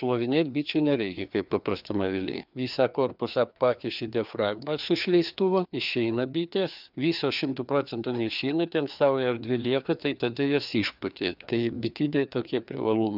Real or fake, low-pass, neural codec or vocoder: fake; 5.4 kHz; codec, 16 kHz, 2 kbps, FreqCodec, larger model